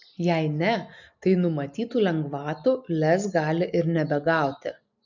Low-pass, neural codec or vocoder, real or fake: 7.2 kHz; none; real